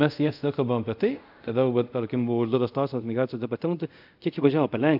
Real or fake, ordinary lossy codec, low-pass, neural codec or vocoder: fake; AAC, 48 kbps; 5.4 kHz; codec, 24 kHz, 0.5 kbps, DualCodec